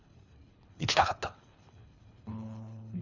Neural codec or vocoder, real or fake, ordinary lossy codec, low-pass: codec, 24 kHz, 3 kbps, HILCodec; fake; none; 7.2 kHz